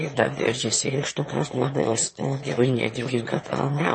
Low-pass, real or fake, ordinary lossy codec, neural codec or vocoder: 9.9 kHz; fake; MP3, 32 kbps; autoencoder, 22.05 kHz, a latent of 192 numbers a frame, VITS, trained on one speaker